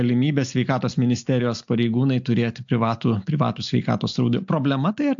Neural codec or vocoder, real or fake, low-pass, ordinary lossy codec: none; real; 7.2 kHz; AAC, 64 kbps